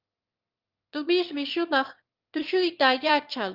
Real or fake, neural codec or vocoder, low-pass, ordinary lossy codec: fake; autoencoder, 22.05 kHz, a latent of 192 numbers a frame, VITS, trained on one speaker; 5.4 kHz; Opus, 32 kbps